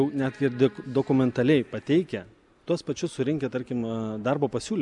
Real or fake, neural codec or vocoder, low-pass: real; none; 10.8 kHz